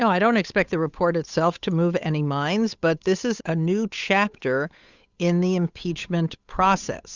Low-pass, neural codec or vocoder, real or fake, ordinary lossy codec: 7.2 kHz; codec, 16 kHz, 8 kbps, FunCodec, trained on Chinese and English, 25 frames a second; fake; Opus, 64 kbps